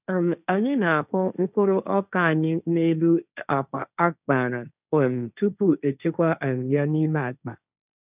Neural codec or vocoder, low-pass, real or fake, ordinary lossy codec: codec, 16 kHz, 1.1 kbps, Voila-Tokenizer; 3.6 kHz; fake; none